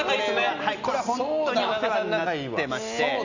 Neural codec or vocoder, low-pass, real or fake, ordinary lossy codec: none; 7.2 kHz; real; none